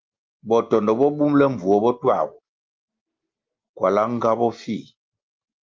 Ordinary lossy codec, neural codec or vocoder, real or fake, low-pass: Opus, 32 kbps; none; real; 7.2 kHz